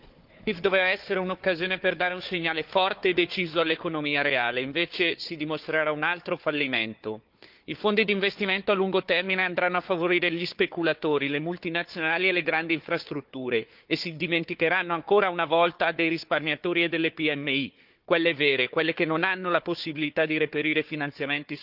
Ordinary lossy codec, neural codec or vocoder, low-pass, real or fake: Opus, 32 kbps; codec, 16 kHz, 4 kbps, FunCodec, trained on Chinese and English, 50 frames a second; 5.4 kHz; fake